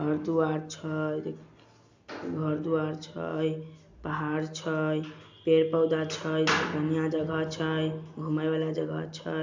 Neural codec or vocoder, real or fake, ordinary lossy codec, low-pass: none; real; MP3, 64 kbps; 7.2 kHz